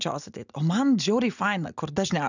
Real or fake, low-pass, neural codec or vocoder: real; 7.2 kHz; none